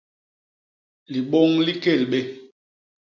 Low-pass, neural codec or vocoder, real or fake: 7.2 kHz; none; real